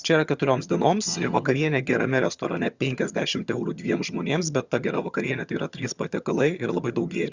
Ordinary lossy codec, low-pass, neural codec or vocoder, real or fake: Opus, 64 kbps; 7.2 kHz; vocoder, 22.05 kHz, 80 mel bands, HiFi-GAN; fake